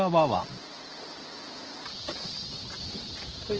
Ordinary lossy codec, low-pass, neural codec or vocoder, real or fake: Opus, 16 kbps; 7.2 kHz; none; real